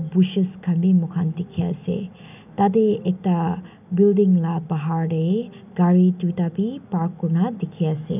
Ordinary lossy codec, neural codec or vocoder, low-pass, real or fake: none; none; 3.6 kHz; real